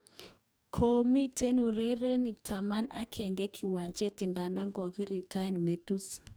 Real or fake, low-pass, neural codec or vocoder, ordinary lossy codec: fake; none; codec, 44.1 kHz, 2.6 kbps, DAC; none